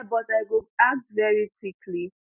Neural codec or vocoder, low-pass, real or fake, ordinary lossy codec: none; 3.6 kHz; real; none